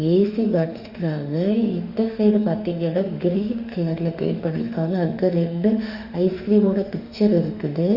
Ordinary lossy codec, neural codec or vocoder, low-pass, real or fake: Opus, 64 kbps; autoencoder, 48 kHz, 32 numbers a frame, DAC-VAE, trained on Japanese speech; 5.4 kHz; fake